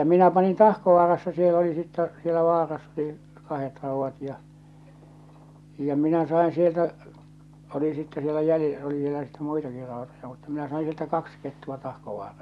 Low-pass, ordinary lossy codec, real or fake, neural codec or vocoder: none; none; real; none